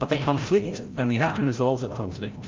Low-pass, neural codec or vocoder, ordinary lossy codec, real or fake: 7.2 kHz; codec, 16 kHz, 0.5 kbps, FreqCodec, larger model; Opus, 24 kbps; fake